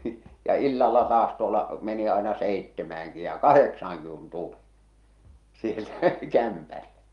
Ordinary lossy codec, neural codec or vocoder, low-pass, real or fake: Opus, 32 kbps; none; 10.8 kHz; real